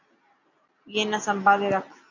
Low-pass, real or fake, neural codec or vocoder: 7.2 kHz; real; none